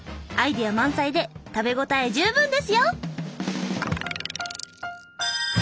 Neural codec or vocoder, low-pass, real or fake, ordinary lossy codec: none; none; real; none